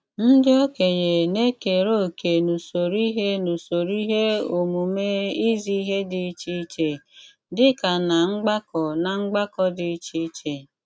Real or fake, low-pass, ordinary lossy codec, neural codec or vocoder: real; none; none; none